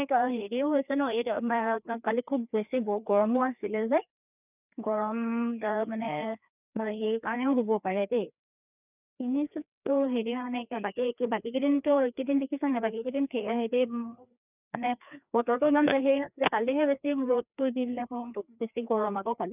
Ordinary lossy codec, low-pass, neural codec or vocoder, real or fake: none; 3.6 kHz; codec, 16 kHz, 2 kbps, FreqCodec, larger model; fake